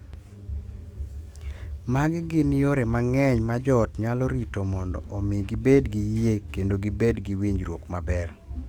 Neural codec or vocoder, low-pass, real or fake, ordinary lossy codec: codec, 44.1 kHz, 7.8 kbps, DAC; 19.8 kHz; fake; Opus, 64 kbps